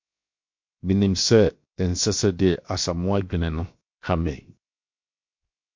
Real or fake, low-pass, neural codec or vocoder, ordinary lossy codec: fake; 7.2 kHz; codec, 16 kHz, 0.7 kbps, FocalCodec; MP3, 48 kbps